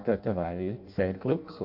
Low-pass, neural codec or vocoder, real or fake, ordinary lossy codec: 5.4 kHz; codec, 16 kHz in and 24 kHz out, 0.6 kbps, FireRedTTS-2 codec; fake; AAC, 48 kbps